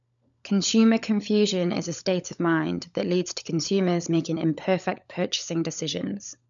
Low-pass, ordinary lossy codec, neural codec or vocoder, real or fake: 7.2 kHz; none; codec, 16 kHz, 16 kbps, FunCodec, trained on LibriTTS, 50 frames a second; fake